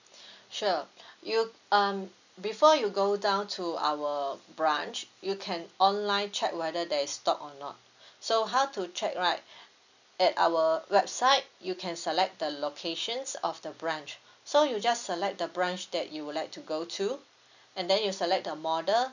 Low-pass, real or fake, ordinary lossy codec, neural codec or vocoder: 7.2 kHz; real; none; none